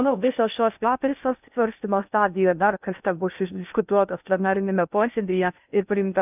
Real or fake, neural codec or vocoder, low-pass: fake; codec, 16 kHz in and 24 kHz out, 0.6 kbps, FocalCodec, streaming, 2048 codes; 3.6 kHz